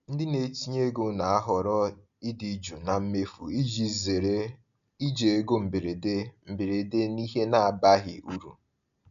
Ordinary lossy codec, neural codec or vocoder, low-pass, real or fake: none; none; 7.2 kHz; real